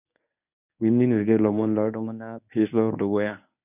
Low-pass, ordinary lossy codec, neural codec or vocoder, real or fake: 3.6 kHz; none; codec, 24 kHz, 0.9 kbps, WavTokenizer, medium speech release version 1; fake